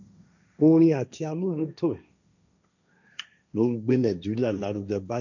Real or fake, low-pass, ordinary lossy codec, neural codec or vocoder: fake; 7.2 kHz; none; codec, 16 kHz, 1.1 kbps, Voila-Tokenizer